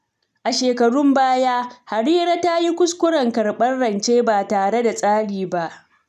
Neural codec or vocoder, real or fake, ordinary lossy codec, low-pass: none; real; none; 14.4 kHz